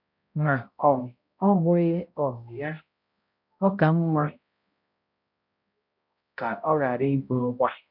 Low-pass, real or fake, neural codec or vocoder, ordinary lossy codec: 5.4 kHz; fake; codec, 16 kHz, 0.5 kbps, X-Codec, HuBERT features, trained on balanced general audio; MP3, 48 kbps